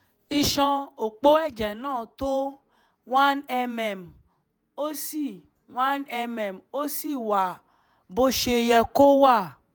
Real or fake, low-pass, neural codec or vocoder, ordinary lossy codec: fake; none; vocoder, 48 kHz, 128 mel bands, Vocos; none